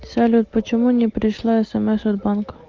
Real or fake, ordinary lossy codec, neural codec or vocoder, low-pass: real; Opus, 32 kbps; none; 7.2 kHz